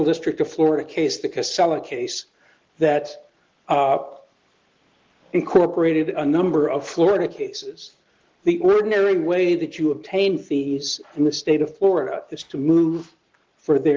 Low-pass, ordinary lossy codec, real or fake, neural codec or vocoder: 7.2 kHz; Opus, 16 kbps; real; none